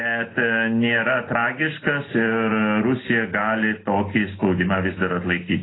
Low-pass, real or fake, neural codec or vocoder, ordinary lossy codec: 7.2 kHz; real; none; AAC, 16 kbps